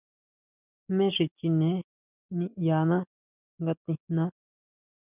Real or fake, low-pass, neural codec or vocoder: real; 3.6 kHz; none